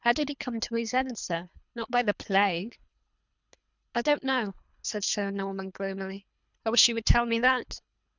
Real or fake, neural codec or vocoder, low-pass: fake; codec, 24 kHz, 3 kbps, HILCodec; 7.2 kHz